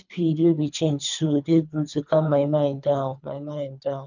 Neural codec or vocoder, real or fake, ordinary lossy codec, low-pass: codec, 24 kHz, 3 kbps, HILCodec; fake; none; 7.2 kHz